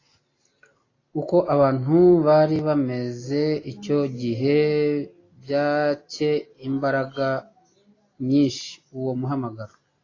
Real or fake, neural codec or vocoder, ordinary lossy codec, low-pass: real; none; AAC, 32 kbps; 7.2 kHz